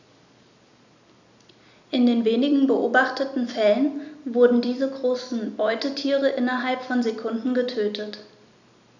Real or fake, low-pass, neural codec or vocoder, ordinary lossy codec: real; 7.2 kHz; none; none